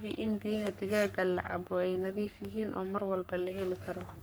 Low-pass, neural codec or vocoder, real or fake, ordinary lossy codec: none; codec, 44.1 kHz, 3.4 kbps, Pupu-Codec; fake; none